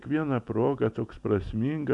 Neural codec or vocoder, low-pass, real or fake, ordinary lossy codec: none; 10.8 kHz; real; MP3, 96 kbps